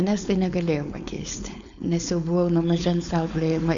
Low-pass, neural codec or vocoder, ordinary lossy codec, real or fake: 7.2 kHz; codec, 16 kHz, 4.8 kbps, FACodec; AAC, 64 kbps; fake